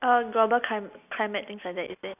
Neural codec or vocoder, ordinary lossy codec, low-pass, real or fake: none; none; 3.6 kHz; real